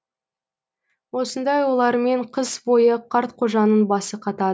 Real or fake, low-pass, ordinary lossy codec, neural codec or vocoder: real; none; none; none